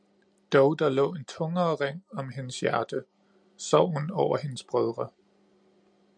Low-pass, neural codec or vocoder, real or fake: 9.9 kHz; none; real